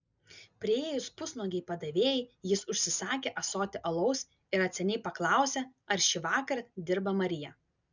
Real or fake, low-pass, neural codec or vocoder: real; 7.2 kHz; none